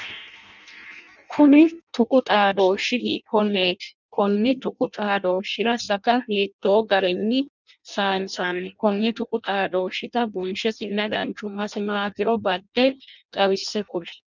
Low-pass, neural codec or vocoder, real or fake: 7.2 kHz; codec, 16 kHz in and 24 kHz out, 0.6 kbps, FireRedTTS-2 codec; fake